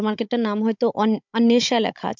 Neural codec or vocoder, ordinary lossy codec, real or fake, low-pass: codec, 16 kHz, 16 kbps, FunCodec, trained on Chinese and English, 50 frames a second; MP3, 64 kbps; fake; 7.2 kHz